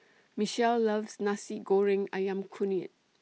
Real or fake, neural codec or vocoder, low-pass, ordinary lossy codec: real; none; none; none